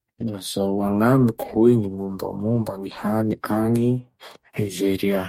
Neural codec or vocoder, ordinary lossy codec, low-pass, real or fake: codec, 44.1 kHz, 2.6 kbps, DAC; MP3, 64 kbps; 19.8 kHz; fake